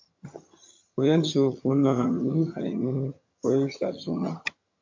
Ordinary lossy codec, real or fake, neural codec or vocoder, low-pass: MP3, 48 kbps; fake; vocoder, 22.05 kHz, 80 mel bands, HiFi-GAN; 7.2 kHz